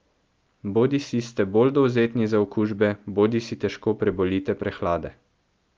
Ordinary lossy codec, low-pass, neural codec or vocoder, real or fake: Opus, 32 kbps; 7.2 kHz; none; real